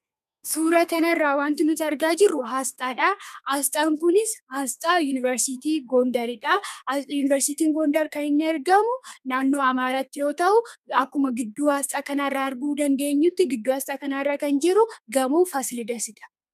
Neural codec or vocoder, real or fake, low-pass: codec, 32 kHz, 1.9 kbps, SNAC; fake; 14.4 kHz